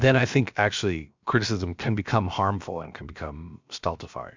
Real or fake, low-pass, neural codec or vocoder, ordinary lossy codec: fake; 7.2 kHz; codec, 16 kHz, about 1 kbps, DyCAST, with the encoder's durations; MP3, 64 kbps